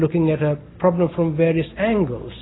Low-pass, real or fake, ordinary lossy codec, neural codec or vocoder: 7.2 kHz; real; AAC, 16 kbps; none